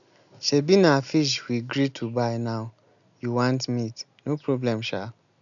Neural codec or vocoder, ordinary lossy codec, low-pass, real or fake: none; none; 7.2 kHz; real